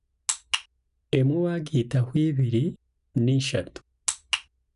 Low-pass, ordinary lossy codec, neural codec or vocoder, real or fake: 10.8 kHz; none; none; real